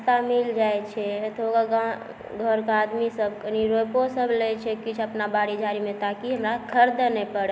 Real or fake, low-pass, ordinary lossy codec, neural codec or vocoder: real; none; none; none